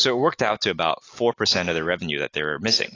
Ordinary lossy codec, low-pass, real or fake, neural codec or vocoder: AAC, 32 kbps; 7.2 kHz; real; none